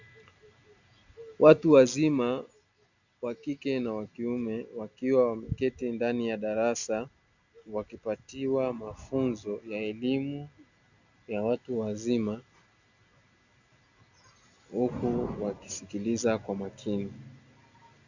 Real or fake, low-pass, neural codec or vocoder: real; 7.2 kHz; none